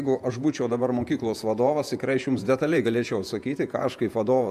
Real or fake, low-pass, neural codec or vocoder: fake; 14.4 kHz; vocoder, 48 kHz, 128 mel bands, Vocos